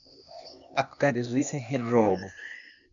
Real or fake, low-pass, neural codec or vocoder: fake; 7.2 kHz; codec, 16 kHz, 0.8 kbps, ZipCodec